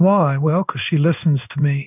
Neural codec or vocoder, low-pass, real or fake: codec, 16 kHz in and 24 kHz out, 1 kbps, XY-Tokenizer; 3.6 kHz; fake